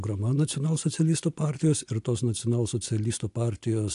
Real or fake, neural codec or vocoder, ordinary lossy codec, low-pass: real; none; AAC, 64 kbps; 10.8 kHz